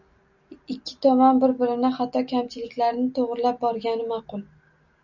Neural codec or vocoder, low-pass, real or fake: none; 7.2 kHz; real